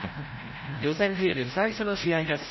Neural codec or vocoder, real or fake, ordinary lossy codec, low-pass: codec, 16 kHz, 0.5 kbps, FreqCodec, larger model; fake; MP3, 24 kbps; 7.2 kHz